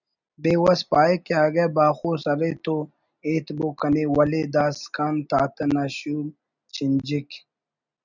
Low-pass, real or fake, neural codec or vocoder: 7.2 kHz; real; none